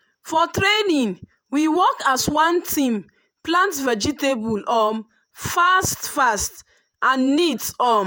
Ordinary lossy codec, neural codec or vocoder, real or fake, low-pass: none; vocoder, 48 kHz, 128 mel bands, Vocos; fake; none